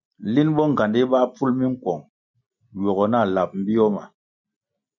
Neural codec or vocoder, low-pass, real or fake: none; 7.2 kHz; real